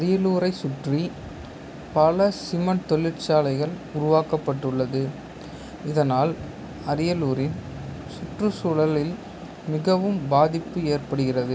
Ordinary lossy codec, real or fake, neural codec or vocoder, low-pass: none; real; none; none